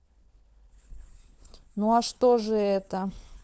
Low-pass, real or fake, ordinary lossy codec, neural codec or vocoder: none; fake; none; codec, 16 kHz, 4 kbps, FunCodec, trained on LibriTTS, 50 frames a second